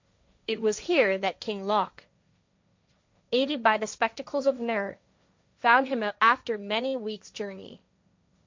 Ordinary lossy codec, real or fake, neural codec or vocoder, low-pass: MP3, 64 kbps; fake; codec, 16 kHz, 1.1 kbps, Voila-Tokenizer; 7.2 kHz